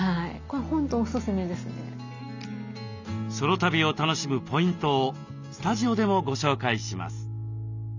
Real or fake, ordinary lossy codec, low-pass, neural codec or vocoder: real; none; 7.2 kHz; none